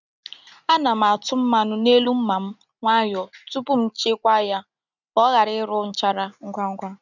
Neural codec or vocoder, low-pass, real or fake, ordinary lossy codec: none; 7.2 kHz; real; none